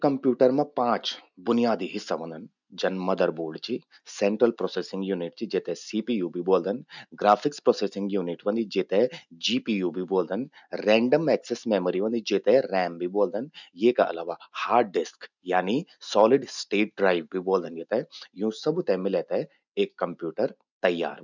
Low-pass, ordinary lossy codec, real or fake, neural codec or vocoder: 7.2 kHz; none; real; none